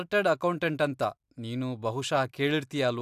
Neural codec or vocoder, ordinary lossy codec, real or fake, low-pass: none; AAC, 96 kbps; real; 14.4 kHz